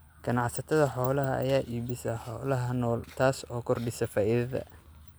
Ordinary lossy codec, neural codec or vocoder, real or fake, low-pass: none; none; real; none